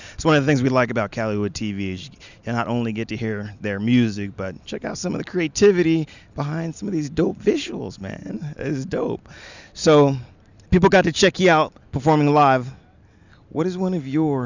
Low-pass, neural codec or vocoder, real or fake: 7.2 kHz; none; real